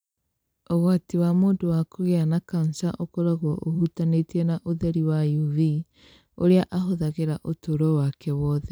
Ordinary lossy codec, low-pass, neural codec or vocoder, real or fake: none; none; vocoder, 44.1 kHz, 128 mel bands every 256 samples, BigVGAN v2; fake